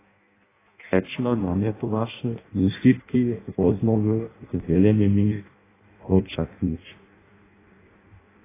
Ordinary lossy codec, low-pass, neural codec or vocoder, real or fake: AAC, 16 kbps; 3.6 kHz; codec, 16 kHz in and 24 kHz out, 0.6 kbps, FireRedTTS-2 codec; fake